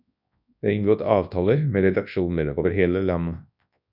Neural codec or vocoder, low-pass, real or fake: codec, 24 kHz, 0.9 kbps, WavTokenizer, large speech release; 5.4 kHz; fake